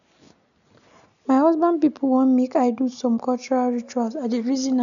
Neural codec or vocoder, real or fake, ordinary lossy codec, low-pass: none; real; none; 7.2 kHz